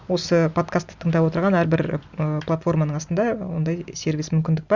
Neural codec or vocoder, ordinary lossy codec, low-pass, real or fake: none; Opus, 64 kbps; 7.2 kHz; real